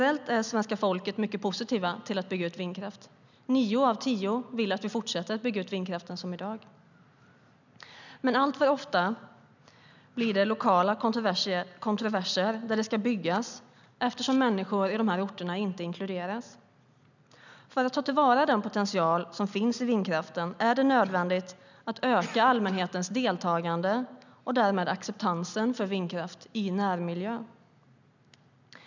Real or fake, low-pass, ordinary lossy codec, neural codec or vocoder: real; 7.2 kHz; none; none